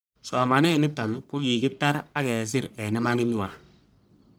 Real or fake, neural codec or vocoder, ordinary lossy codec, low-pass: fake; codec, 44.1 kHz, 1.7 kbps, Pupu-Codec; none; none